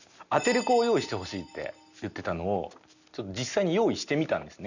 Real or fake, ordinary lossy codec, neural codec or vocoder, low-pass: real; none; none; 7.2 kHz